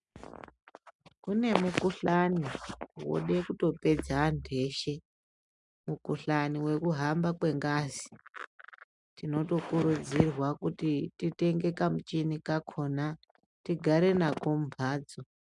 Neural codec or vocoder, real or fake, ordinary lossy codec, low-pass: none; real; MP3, 96 kbps; 10.8 kHz